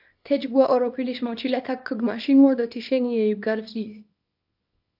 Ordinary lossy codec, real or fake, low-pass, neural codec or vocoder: AAC, 48 kbps; fake; 5.4 kHz; codec, 24 kHz, 0.9 kbps, WavTokenizer, small release